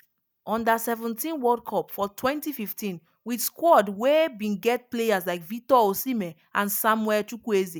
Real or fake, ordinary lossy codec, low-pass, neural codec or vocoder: real; none; none; none